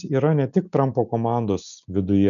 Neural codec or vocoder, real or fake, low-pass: none; real; 7.2 kHz